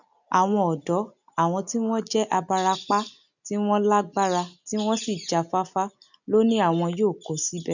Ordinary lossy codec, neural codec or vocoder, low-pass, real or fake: none; none; 7.2 kHz; real